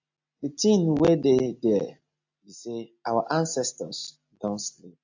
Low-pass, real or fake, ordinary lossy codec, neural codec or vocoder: 7.2 kHz; real; AAC, 48 kbps; none